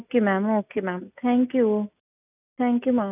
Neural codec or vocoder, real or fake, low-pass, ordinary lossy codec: none; real; 3.6 kHz; none